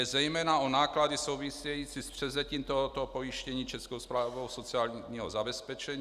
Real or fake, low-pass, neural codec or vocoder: real; 14.4 kHz; none